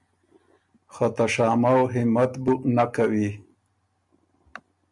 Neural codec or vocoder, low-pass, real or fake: none; 10.8 kHz; real